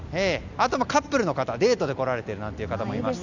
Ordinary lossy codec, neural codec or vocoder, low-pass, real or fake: none; none; 7.2 kHz; real